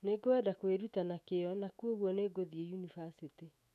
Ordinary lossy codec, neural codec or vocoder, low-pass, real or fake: none; autoencoder, 48 kHz, 128 numbers a frame, DAC-VAE, trained on Japanese speech; 14.4 kHz; fake